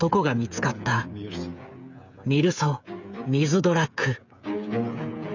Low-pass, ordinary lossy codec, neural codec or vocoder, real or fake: 7.2 kHz; none; codec, 16 kHz, 8 kbps, FreqCodec, smaller model; fake